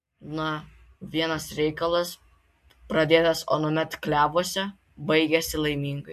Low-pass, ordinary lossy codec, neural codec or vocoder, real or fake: 14.4 kHz; MP3, 64 kbps; none; real